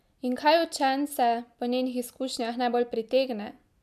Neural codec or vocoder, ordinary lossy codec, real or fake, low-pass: none; MP3, 96 kbps; real; 14.4 kHz